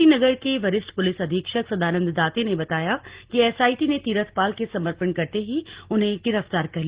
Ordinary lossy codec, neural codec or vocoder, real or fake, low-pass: Opus, 16 kbps; none; real; 3.6 kHz